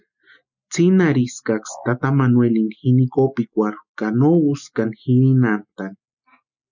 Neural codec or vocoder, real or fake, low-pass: none; real; 7.2 kHz